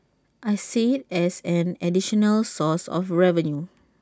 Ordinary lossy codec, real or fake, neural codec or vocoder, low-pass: none; real; none; none